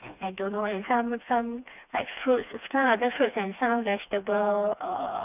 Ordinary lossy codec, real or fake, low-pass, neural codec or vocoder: none; fake; 3.6 kHz; codec, 16 kHz, 2 kbps, FreqCodec, smaller model